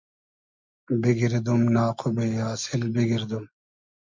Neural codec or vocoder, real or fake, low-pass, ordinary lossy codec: none; real; 7.2 kHz; MP3, 64 kbps